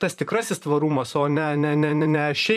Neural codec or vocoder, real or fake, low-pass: vocoder, 44.1 kHz, 128 mel bands, Pupu-Vocoder; fake; 14.4 kHz